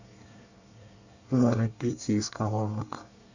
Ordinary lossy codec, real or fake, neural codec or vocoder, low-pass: none; fake; codec, 24 kHz, 1 kbps, SNAC; 7.2 kHz